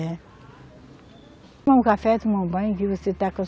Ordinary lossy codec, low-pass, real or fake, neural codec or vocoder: none; none; real; none